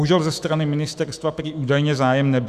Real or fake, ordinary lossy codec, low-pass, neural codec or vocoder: fake; Opus, 64 kbps; 14.4 kHz; autoencoder, 48 kHz, 128 numbers a frame, DAC-VAE, trained on Japanese speech